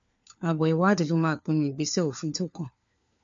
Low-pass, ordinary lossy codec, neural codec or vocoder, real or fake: 7.2 kHz; MP3, 48 kbps; codec, 16 kHz, 4 kbps, FunCodec, trained on LibriTTS, 50 frames a second; fake